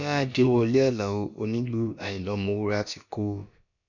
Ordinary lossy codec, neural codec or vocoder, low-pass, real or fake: none; codec, 16 kHz, about 1 kbps, DyCAST, with the encoder's durations; 7.2 kHz; fake